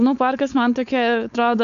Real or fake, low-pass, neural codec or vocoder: fake; 7.2 kHz; codec, 16 kHz, 4.8 kbps, FACodec